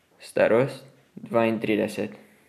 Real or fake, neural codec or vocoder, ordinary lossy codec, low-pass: real; none; MP3, 96 kbps; 14.4 kHz